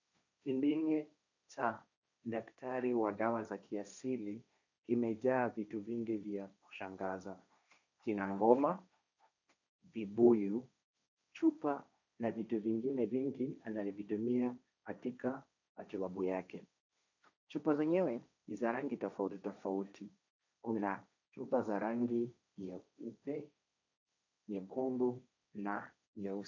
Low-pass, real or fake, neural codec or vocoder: 7.2 kHz; fake; codec, 16 kHz, 1.1 kbps, Voila-Tokenizer